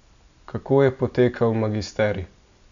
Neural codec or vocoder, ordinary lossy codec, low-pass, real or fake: none; none; 7.2 kHz; real